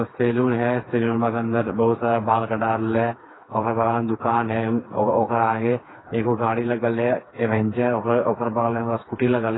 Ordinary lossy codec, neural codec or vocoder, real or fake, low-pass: AAC, 16 kbps; codec, 16 kHz, 4 kbps, FreqCodec, smaller model; fake; 7.2 kHz